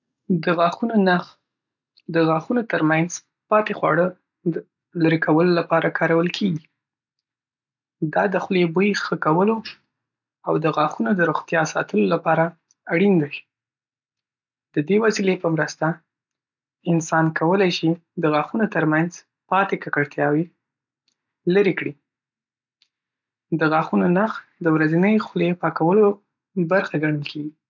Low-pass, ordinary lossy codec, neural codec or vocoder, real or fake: 7.2 kHz; none; none; real